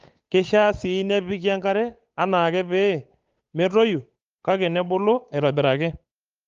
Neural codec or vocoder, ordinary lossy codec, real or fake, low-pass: codec, 16 kHz, 8 kbps, FunCodec, trained on Chinese and English, 25 frames a second; Opus, 32 kbps; fake; 7.2 kHz